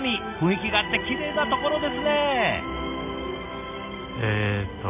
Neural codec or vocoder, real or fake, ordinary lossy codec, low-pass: none; real; none; 3.6 kHz